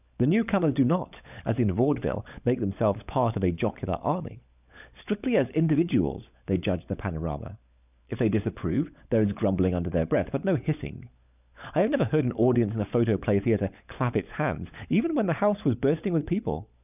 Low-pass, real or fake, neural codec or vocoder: 3.6 kHz; fake; codec, 16 kHz, 16 kbps, FunCodec, trained on LibriTTS, 50 frames a second